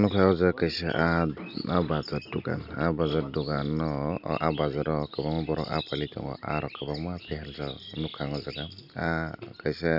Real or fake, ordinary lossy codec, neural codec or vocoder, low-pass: real; none; none; 5.4 kHz